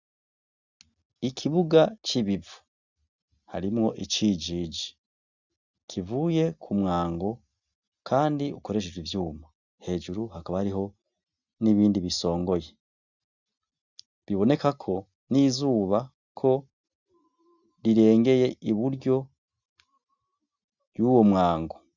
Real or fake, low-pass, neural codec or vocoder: real; 7.2 kHz; none